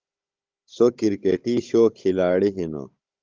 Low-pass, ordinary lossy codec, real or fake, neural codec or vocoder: 7.2 kHz; Opus, 32 kbps; fake; codec, 16 kHz, 16 kbps, FunCodec, trained on Chinese and English, 50 frames a second